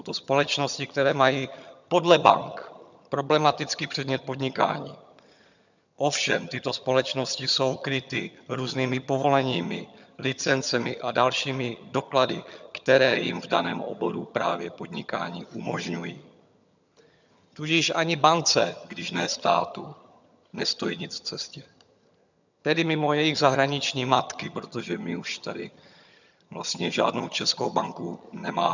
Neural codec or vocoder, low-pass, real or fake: vocoder, 22.05 kHz, 80 mel bands, HiFi-GAN; 7.2 kHz; fake